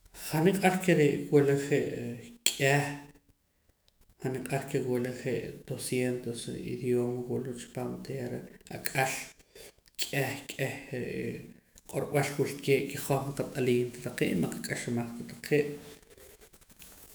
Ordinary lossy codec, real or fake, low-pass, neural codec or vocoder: none; fake; none; autoencoder, 48 kHz, 128 numbers a frame, DAC-VAE, trained on Japanese speech